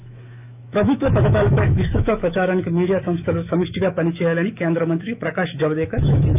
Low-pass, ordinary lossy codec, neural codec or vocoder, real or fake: 3.6 kHz; none; autoencoder, 48 kHz, 128 numbers a frame, DAC-VAE, trained on Japanese speech; fake